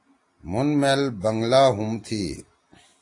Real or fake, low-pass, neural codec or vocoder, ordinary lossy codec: real; 10.8 kHz; none; AAC, 48 kbps